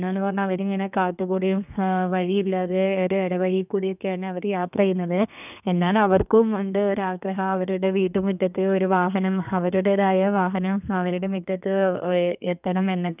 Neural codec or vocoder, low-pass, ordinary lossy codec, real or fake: codec, 32 kHz, 1.9 kbps, SNAC; 3.6 kHz; none; fake